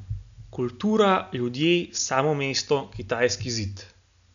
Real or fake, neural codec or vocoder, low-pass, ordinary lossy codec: real; none; 7.2 kHz; none